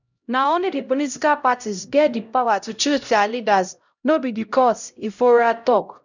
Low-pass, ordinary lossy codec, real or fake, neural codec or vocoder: 7.2 kHz; none; fake; codec, 16 kHz, 0.5 kbps, X-Codec, HuBERT features, trained on LibriSpeech